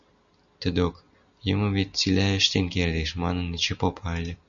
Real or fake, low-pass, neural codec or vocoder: real; 7.2 kHz; none